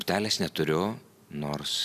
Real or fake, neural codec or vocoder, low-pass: real; none; 14.4 kHz